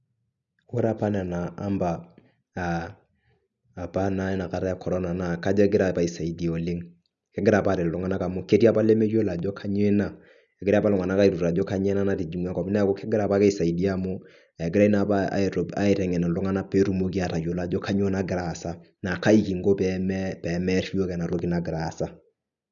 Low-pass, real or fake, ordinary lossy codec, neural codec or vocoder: 7.2 kHz; real; none; none